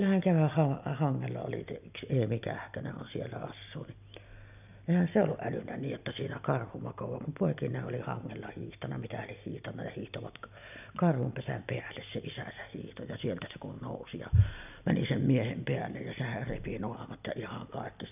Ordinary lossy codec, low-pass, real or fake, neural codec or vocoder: none; 3.6 kHz; real; none